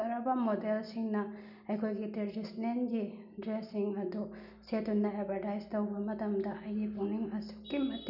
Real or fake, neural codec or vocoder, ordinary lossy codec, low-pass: real; none; none; 5.4 kHz